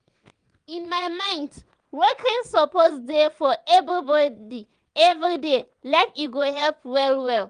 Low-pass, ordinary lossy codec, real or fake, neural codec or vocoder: 9.9 kHz; Opus, 32 kbps; fake; vocoder, 22.05 kHz, 80 mel bands, WaveNeXt